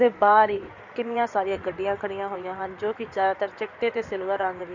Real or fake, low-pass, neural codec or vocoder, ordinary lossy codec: fake; 7.2 kHz; codec, 16 kHz in and 24 kHz out, 2.2 kbps, FireRedTTS-2 codec; none